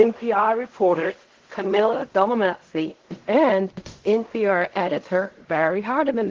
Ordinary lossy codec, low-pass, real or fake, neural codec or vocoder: Opus, 16 kbps; 7.2 kHz; fake; codec, 16 kHz in and 24 kHz out, 0.4 kbps, LongCat-Audio-Codec, fine tuned four codebook decoder